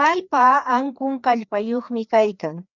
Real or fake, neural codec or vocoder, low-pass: fake; codec, 16 kHz, 2 kbps, FreqCodec, larger model; 7.2 kHz